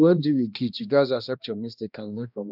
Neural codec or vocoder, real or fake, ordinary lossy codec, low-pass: codec, 16 kHz, 1 kbps, X-Codec, HuBERT features, trained on balanced general audio; fake; none; 5.4 kHz